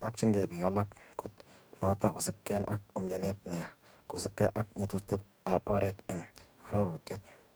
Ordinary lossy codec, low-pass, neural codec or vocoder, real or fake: none; none; codec, 44.1 kHz, 2.6 kbps, DAC; fake